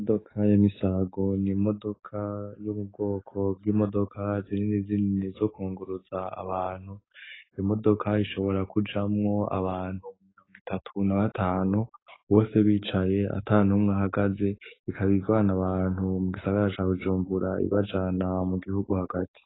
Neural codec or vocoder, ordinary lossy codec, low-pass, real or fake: codec, 44.1 kHz, 7.8 kbps, DAC; AAC, 16 kbps; 7.2 kHz; fake